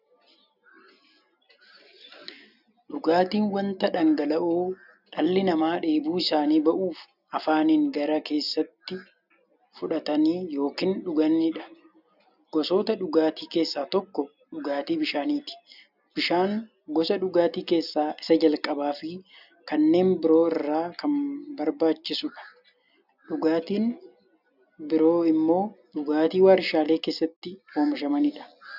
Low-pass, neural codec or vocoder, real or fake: 5.4 kHz; none; real